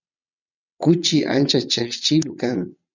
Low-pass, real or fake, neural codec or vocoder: 7.2 kHz; fake; vocoder, 22.05 kHz, 80 mel bands, WaveNeXt